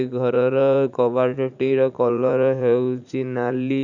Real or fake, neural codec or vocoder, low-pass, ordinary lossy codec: fake; vocoder, 44.1 kHz, 80 mel bands, Vocos; 7.2 kHz; none